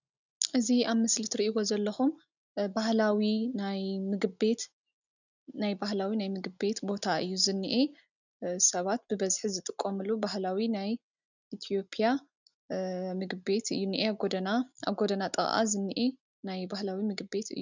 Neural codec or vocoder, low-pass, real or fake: none; 7.2 kHz; real